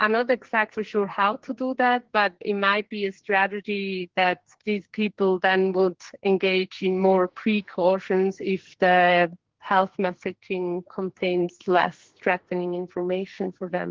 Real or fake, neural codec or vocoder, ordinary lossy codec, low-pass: fake; codec, 44.1 kHz, 2.6 kbps, SNAC; Opus, 16 kbps; 7.2 kHz